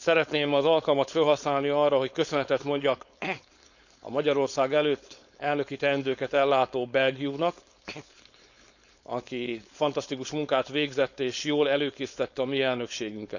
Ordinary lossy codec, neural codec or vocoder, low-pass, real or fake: none; codec, 16 kHz, 4.8 kbps, FACodec; 7.2 kHz; fake